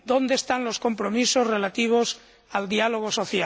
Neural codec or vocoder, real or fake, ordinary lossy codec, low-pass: none; real; none; none